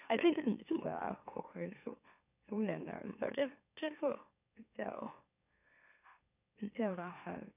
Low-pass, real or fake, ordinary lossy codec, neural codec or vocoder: 3.6 kHz; fake; none; autoencoder, 44.1 kHz, a latent of 192 numbers a frame, MeloTTS